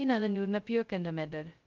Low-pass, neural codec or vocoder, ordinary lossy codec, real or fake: 7.2 kHz; codec, 16 kHz, 0.2 kbps, FocalCodec; Opus, 32 kbps; fake